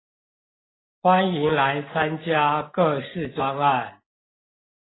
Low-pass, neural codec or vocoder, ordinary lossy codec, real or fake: 7.2 kHz; none; AAC, 16 kbps; real